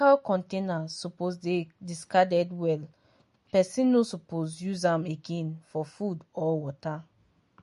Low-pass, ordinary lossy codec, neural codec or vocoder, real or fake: 14.4 kHz; MP3, 48 kbps; none; real